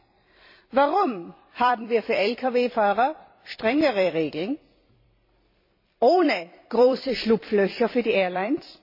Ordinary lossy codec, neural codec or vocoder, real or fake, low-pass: MP3, 24 kbps; vocoder, 44.1 kHz, 128 mel bands every 256 samples, BigVGAN v2; fake; 5.4 kHz